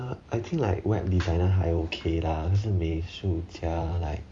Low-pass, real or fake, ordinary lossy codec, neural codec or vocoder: 9.9 kHz; fake; none; vocoder, 44.1 kHz, 128 mel bands every 512 samples, BigVGAN v2